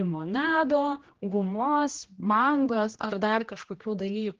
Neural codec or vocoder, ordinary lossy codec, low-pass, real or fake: codec, 16 kHz, 1 kbps, X-Codec, HuBERT features, trained on general audio; Opus, 16 kbps; 7.2 kHz; fake